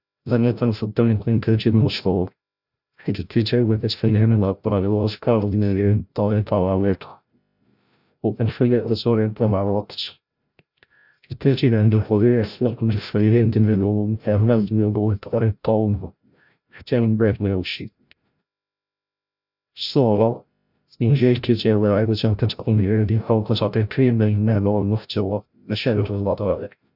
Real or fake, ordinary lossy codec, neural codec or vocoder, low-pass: fake; none; codec, 16 kHz, 0.5 kbps, FreqCodec, larger model; 5.4 kHz